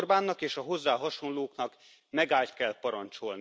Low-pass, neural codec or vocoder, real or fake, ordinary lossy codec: none; none; real; none